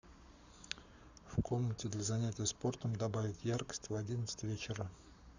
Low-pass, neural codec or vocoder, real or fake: 7.2 kHz; codec, 44.1 kHz, 7.8 kbps, Pupu-Codec; fake